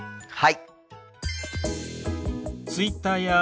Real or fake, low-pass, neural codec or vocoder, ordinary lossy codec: real; none; none; none